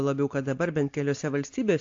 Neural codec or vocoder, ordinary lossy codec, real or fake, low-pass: none; AAC, 48 kbps; real; 7.2 kHz